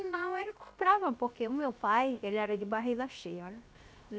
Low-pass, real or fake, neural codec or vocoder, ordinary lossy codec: none; fake; codec, 16 kHz, 0.7 kbps, FocalCodec; none